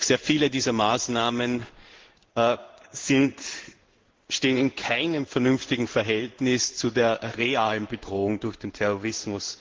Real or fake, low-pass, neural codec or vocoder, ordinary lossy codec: fake; 7.2 kHz; codec, 16 kHz in and 24 kHz out, 1 kbps, XY-Tokenizer; Opus, 16 kbps